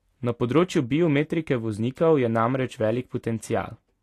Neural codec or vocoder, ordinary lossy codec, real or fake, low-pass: none; AAC, 48 kbps; real; 14.4 kHz